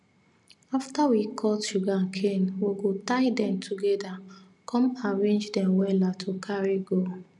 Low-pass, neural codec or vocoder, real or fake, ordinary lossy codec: 10.8 kHz; none; real; none